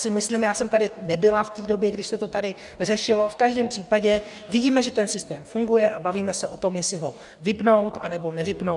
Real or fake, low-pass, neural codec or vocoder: fake; 10.8 kHz; codec, 44.1 kHz, 2.6 kbps, DAC